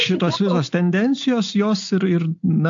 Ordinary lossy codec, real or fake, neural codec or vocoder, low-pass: AAC, 64 kbps; real; none; 7.2 kHz